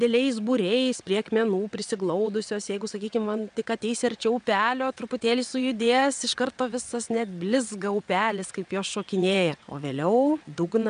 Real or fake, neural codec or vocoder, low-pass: fake; vocoder, 22.05 kHz, 80 mel bands, WaveNeXt; 9.9 kHz